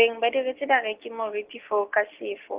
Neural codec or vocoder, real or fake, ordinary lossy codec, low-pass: none; real; Opus, 32 kbps; 3.6 kHz